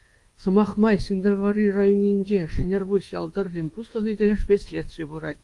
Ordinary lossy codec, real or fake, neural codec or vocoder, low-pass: Opus, 24 kbps; fake; codec, 24 kHz, 1.2 kbps, DualCodec; 10.8 kHz